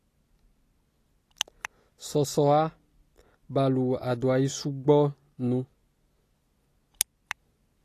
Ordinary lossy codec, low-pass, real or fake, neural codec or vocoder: AAC, 48 kbps; 14.4 kHz; real; none